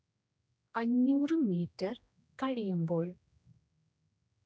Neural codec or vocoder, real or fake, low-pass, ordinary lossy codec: codec, 16 kHz, 1 kbps, X-Codec, HuBERT features, trained on general audio; fake; none; none